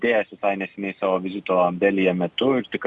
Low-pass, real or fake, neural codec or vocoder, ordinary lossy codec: 10.8 kHz; real; none; Opus, 64 kbps